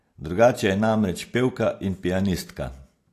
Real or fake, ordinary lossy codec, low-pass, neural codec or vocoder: real; AAC, 64 kbps; 14.4 kHz; none